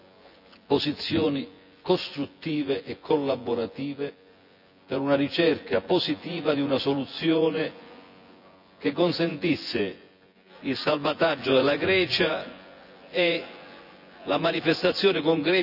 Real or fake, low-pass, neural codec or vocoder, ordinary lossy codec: fake; 5.4 kHz; vocoder, 24 kHz, 100 mel bands, Vocos; none